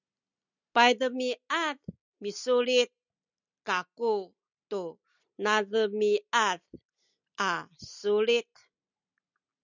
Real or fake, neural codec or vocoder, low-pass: real; none; 7.2 kHz